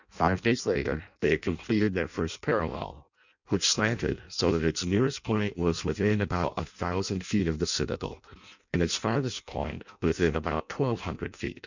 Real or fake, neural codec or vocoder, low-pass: fake; codec, 16 kHz in and 24 kHz out, 0.6 kbps, FireRedTTS-2 codec; 7.2 kHz